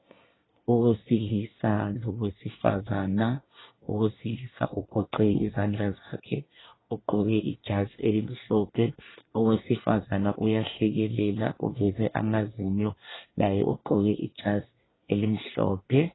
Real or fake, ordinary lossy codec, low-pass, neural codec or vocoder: fake; AAC, 16 kbps; 7.2 kHz; codec, 24 kHz, 1 kbps, SNAC